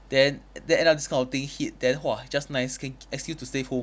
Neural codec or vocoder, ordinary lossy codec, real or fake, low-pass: none; none; real; none